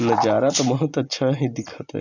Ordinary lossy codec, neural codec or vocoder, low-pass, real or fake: none; none; 7.2 kHz; real